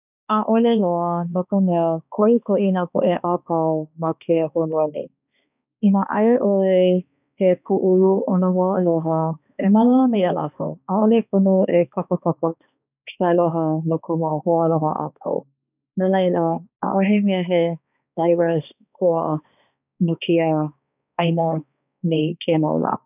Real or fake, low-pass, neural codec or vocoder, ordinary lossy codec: fake; 3.6 kHz; codec, 16 kHz, 2 kbps, X-Codec, HuBERT features, trained on balanced general audio; none